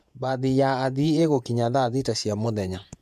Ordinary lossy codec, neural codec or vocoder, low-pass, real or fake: MP3, 96 kbps; vocoder, 48 kHz, 128 mel bands, Vocos; 14.4 kHz; fake